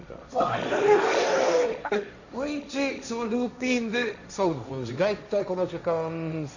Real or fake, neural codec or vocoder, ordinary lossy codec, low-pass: fake; codec, 16 kHz, 1.1 kbps, Voila-Tokenizer; none; 7.2 kHz